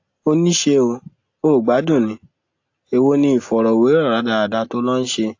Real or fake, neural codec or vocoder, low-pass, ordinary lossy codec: real; none; 7.2 kHz; AAC, 48 kbps